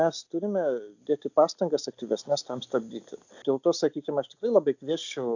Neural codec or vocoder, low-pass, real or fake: none; 7.2 kHz; real